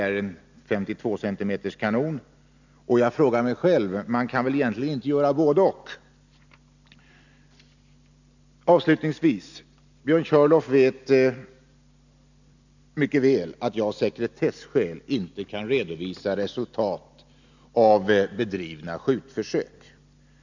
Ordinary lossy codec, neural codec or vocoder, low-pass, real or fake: none; none; 7.2 kHz; real